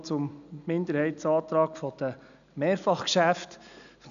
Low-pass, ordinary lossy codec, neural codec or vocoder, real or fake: 7.2 kHz; none; none; real